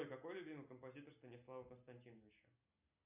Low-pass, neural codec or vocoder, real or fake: 3.6 kHz; none; real